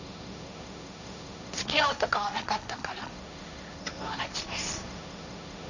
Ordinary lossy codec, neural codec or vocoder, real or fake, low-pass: none; codec, 16 kHz, 1.1 kbps, Voila-Tokenizer; fake; 7.2 kHz